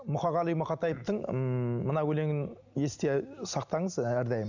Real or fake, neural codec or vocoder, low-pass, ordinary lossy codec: real; none; 7.2 kHz; none